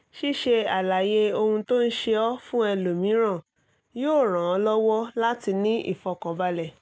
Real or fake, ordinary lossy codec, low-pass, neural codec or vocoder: real; none; none; none